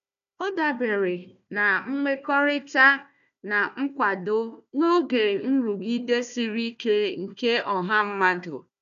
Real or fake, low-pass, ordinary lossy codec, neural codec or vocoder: fake; 7.2 kHz; none; codec, 16 kHz, 1 kbps, FunCodec, trained on Chinese and English, 50 frames a second